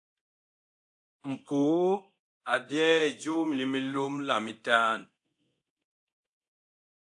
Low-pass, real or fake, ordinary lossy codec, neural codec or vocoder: 10.8 kHz; fake; AAC, 64 kbps; codec, 24 kHz, 0.9 kbps, DualCodec